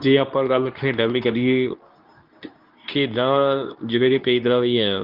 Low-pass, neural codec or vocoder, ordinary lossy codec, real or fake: 5.4 kHz; codec, 24 kHz, 0.9 kbps, WavTokenizer, medium speech release version 2; Opus, 32 kbps; fake